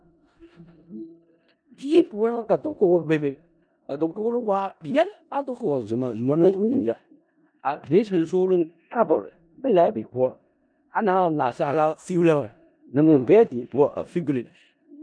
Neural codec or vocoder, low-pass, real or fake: codec, 16 kHz in and 24 kHz out, 0.4 kbps, LongCat-Audio-Codec, four codebook decoder; 9.9 kHz; fake